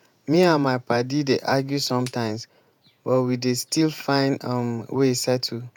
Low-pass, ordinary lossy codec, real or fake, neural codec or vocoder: 19.8 kHz; none; fake; vocoder, 48 kHz, 128 mel bands, Vocos